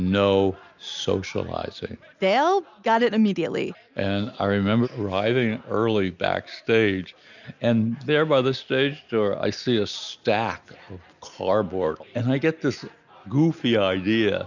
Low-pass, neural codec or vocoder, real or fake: 7.2 kHz; none; real